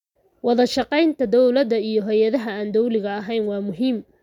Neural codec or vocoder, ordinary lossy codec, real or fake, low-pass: none; none; real; 19.8 kHz